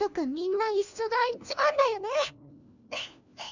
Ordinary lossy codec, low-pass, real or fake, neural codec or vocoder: none; 7.2 kHz; fake; codec, 16 kHz, 1 kbps, FunCodec, trained on LibriTTS, 50 frames a second